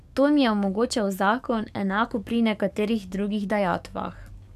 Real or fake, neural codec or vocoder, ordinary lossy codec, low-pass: fake; codec, 44.1 kHz, 7.8 kbps, DAC; none; 14.4 kHz